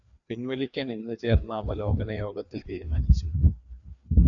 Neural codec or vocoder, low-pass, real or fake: codec, 16 kHz, 2 kbps, FreqCodec, larger model; 7.2 kHz; fake